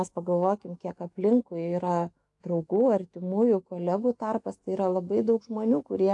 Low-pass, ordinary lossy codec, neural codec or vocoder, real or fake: 10.8 kHz; AAC, 64 kbps; autoencoder, 48 kHz, 128 numbers a frame, DAC-VAE, trained on Japanese speech; fake